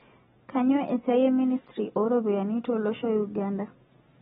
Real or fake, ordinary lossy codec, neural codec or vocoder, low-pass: real; AAC, 16 kbps; none; 10.8 kHz